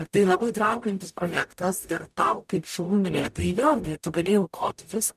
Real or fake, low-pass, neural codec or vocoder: fake; 14.4 kHz; codec, 44.1 kHz, 0.9 kbps, DAC